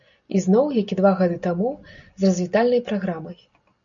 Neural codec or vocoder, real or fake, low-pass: none; real; 7.2 kHz